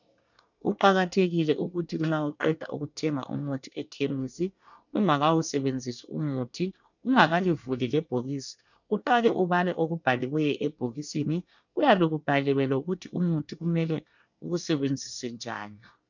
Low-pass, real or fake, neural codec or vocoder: 7.2 kHz; fake; codec, 24 kHz, 1 kbps, SNAC